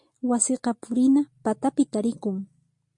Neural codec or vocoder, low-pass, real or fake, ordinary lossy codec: none; 10.8 kHz; real; MP3, 48 kbps